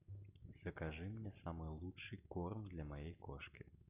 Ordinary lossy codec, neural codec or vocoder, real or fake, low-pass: AAC, 32 kbps; none; real; 3.6 kHz